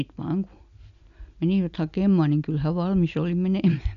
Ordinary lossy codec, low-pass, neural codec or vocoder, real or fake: none; 7.2 kHz; none; real